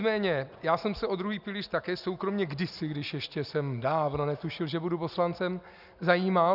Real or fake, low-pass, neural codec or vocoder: real; 5.4 kHz; none